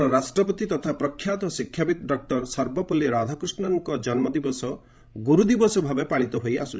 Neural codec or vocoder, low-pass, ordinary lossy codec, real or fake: codec, 16 kHz, 16 kbps, FreqCodec, larger model; none; none; fake